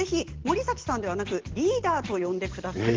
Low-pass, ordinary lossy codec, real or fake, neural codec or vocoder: 7.2 kHz; Opus, 16 kbps; real; none